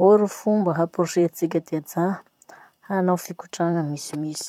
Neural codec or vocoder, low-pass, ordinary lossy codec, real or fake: vocoder, 44.1 kHz, 128 mel bands, Pupu-Vocoder; 19.8 kHz; none; fake